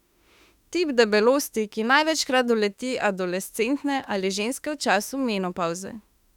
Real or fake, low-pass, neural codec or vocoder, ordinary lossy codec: fake; 19.8 kHz; autoencoder, 48 kHz, 32 numbers a frame, DAC-VAE, trained on Japanese speech; none